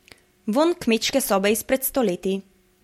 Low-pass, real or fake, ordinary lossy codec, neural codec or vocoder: 19.8 kHz; real; MP3, 64 kbps; none